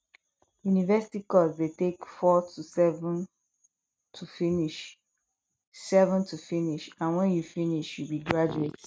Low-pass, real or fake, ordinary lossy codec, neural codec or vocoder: none; real; none; none